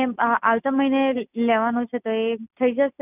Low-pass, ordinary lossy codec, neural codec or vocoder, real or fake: 3.6 kHz; none; none; real